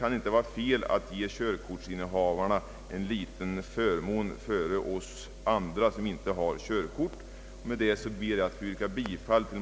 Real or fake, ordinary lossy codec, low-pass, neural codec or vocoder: real; none; none; none